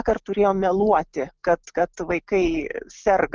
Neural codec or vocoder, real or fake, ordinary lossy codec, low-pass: none; real; Opus, 24 kbps; 7.2 kHz